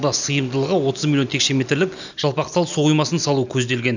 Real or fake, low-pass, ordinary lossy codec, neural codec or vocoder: real; 7.2 kHz; none; none